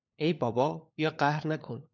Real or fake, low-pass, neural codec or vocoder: fake; 7.2 kHz; codec, 16 kHz, 4 kbps, FunCodec, trained on LibriTTS, 50 frames a second